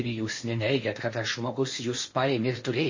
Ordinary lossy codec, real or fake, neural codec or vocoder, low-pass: MP3, 32 kbps; fake; codec, 16 kHz in and 24 kHz out, 0.8 kbps, FocalCodec, streaming, 65536 codes; 7.2 kHz